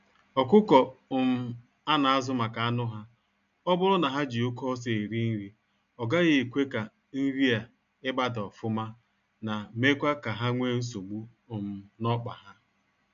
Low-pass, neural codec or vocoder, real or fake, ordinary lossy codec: 7.2 kHz; none; real; none